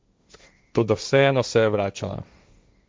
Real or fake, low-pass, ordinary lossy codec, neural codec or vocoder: fake; none; none; codec, 16 kHz, 1.1 kbps, Voila-Tokenizer